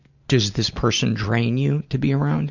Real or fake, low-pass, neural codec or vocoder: real; 7.2 kHz; none